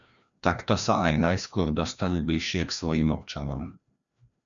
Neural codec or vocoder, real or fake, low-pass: codec, 16 kHz, 1 kbps, FreqCodec, larger model; fake; 7.2 kHz